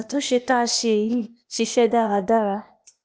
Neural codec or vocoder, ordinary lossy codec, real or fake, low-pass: codec, 16 kHz, 0.8 kbps, ZipCodec; none; fake; none